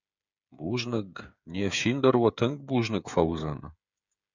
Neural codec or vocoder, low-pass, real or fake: codec, 16 kHz, 8 kbps, FreqCodec, smaller model; 7.2 kHz; fake